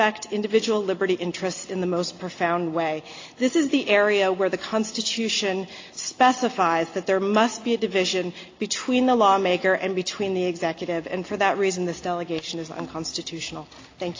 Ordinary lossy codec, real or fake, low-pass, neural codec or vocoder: AAC, 32 kbps; real; 7.2 kHz; none